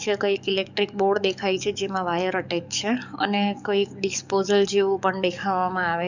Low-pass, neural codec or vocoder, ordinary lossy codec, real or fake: 7.2 kHz; codec, 44.1 kHz, 7.8 kbps, DAC; none; fake